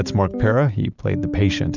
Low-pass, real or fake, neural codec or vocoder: 7.2 kHz; real; none